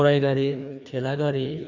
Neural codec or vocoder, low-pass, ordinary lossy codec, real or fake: codec, 16 kHz, 2 kbps, FreqCodec, larger model; 7.2 kHz; none; fake